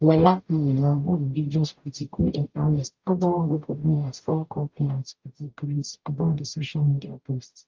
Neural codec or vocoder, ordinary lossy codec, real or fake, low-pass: codec, 44.1 kHz, 0.9 kbps, DAC; Opus, 24 kbps; fake; 7.2 kHz